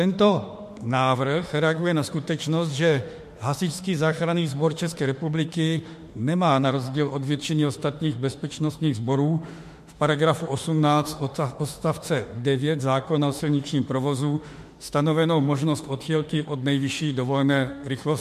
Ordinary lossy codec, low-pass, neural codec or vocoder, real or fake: MP3, 64 kbps; 14.4 kHz; autoencoder, 48 kHz, 32 numbers a frame, DAC-VAE, trained on Japanese speech; fake